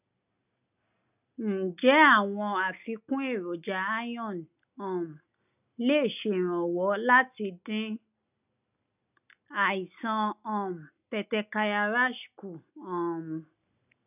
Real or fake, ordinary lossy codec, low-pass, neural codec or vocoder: real; none; 3.6 kHz; none